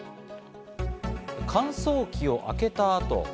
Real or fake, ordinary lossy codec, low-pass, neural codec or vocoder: real; none; none; none